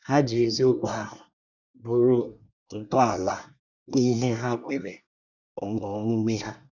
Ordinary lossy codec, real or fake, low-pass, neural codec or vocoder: none; fake; 7.2 kHz; codec, 24 kHz, 1 kbps, SNAC